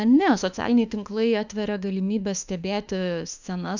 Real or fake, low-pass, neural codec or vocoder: fake; 7.2 kHz; autoencoder, 48 kHz, 32 numbers a frame, DAC-VAE, trained on Japanese speech